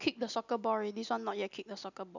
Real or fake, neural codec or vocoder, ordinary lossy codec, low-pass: real; none; none; 7.2 kHz